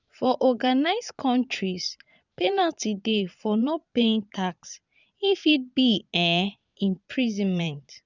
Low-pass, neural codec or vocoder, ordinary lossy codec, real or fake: 7.2 kHz; vocoder, 22.05 kHz, 80 mel bands, Vocos; none; fake